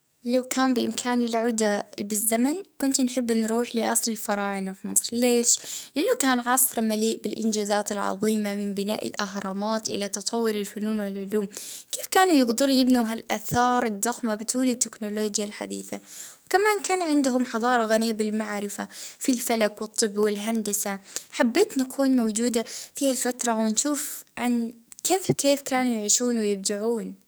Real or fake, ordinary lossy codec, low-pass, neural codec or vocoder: fake; none; none; codec, 44.1 kHz, 2.6 kbps, SNAC